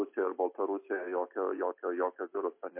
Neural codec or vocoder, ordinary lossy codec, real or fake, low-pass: none; MP3, 24 kbps; real; 3.6 kHz